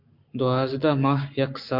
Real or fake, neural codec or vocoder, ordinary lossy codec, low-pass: fake; codec, 44.1 kHz, 7.8 kbps, Pupu-Codec; MP3, 48 kbps; 5.4 kHz